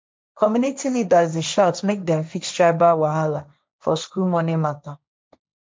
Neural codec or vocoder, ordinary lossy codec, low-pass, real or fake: codec, 16 kHz, 1.1 kbps, Voila-Tokenizer; none; none; fake